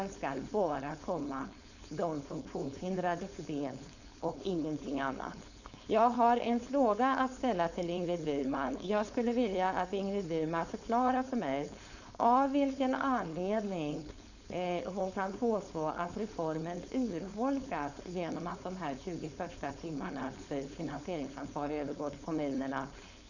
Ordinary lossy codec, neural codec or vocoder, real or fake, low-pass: none; codec, 16 kHz, 4.8 kbps, FACodec; fake; 7.2 kHz